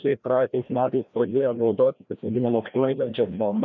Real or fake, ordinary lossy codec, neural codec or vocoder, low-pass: fake; MP3, 64 kbps; codec, 16 kHz, 1 kbps, FreqCodec, larger model; 7.2 kHz